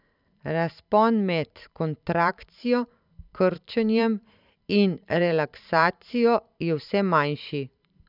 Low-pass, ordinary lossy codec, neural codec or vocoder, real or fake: 5.4 kHz; none; vocoder, 44.1 kHz, 128 mel bands every 512 samples, BigVGAN v2; fake